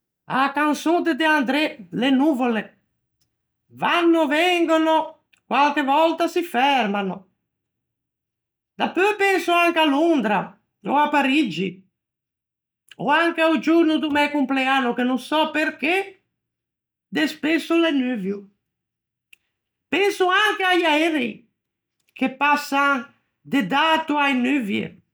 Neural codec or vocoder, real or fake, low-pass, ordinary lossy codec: none; real; none; none